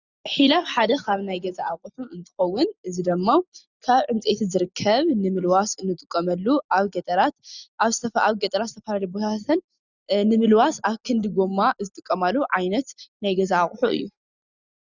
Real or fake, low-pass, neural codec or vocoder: real; 7.2 kHz; none